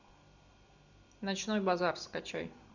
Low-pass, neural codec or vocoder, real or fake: 7.2 kHz; none; real